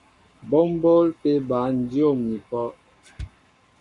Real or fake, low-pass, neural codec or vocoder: fake; 10.8 kHz; codec, 44.1 kHz, 7.8 kbps, Pupu-Codec